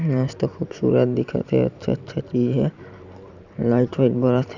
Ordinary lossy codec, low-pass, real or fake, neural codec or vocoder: none; 7.2 kHz; fake; vocoder, 44.1 kHz, 128 mel bands every 512 samples, BigVGAN v2